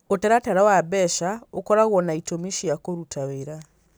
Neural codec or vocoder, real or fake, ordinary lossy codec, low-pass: none; real; none; none